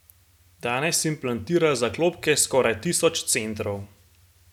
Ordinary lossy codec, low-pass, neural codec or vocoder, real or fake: none; 19.8 kHz; none; real